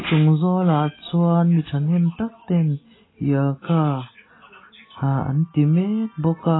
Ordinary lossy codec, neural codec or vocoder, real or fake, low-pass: AAC, 16 kbps; none; real; 7.2 kHz